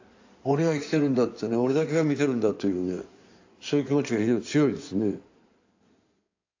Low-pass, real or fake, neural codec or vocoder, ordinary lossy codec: 7.2 kHz; fake; codec, 16 kHz in and 24 kHz out, 2.2 kbps, FireRedTTS-2 codec; AAC, 48 kbps